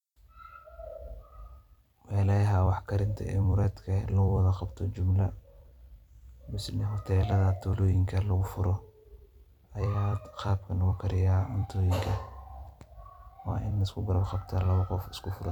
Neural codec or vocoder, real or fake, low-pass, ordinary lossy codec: vocoder, 44.1 kHz, 128 mel bands every 256 samples, BigVGAN v2; fake; 19.8 kHz; none